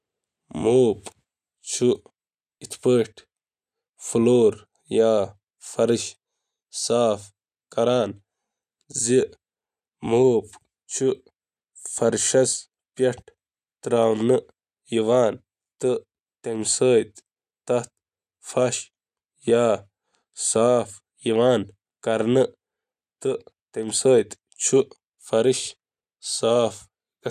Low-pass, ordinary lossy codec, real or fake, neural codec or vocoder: 14.4 kHz; none; real; none